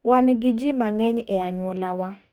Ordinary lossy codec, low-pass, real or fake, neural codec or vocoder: none; 19.8 kHz; fake; codec, 44.1 kHz, 2.6 kbps, DAC